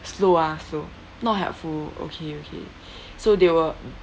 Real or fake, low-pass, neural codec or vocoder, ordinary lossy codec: real; none; none; none